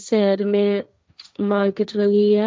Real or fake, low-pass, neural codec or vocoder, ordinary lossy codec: fake; none; codec, 16 kHz, 1.1 kbps, Voila-Tokenizer; none